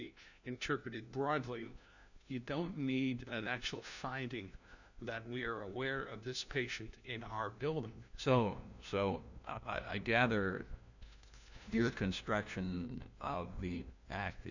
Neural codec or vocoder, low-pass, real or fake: codec, 16 kHz, 1 kbps, FunCodec, trained on LibriTTS, 50 frames a second; 7.2 kHz; fake